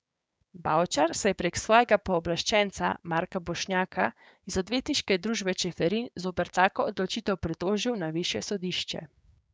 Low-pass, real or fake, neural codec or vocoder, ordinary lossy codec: none; fake; codec, 16 kHz, 6 kbps, DAC; none